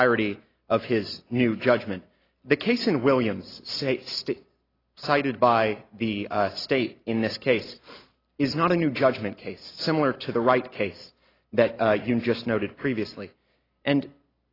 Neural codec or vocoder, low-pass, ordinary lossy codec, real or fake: none; 5.4 kHz; AAC, 24 kbps; real